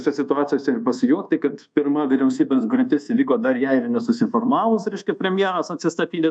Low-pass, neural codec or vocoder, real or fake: 9.9 kHz; codec, 24 kHz, 1.2 kbps, DualCodec; fake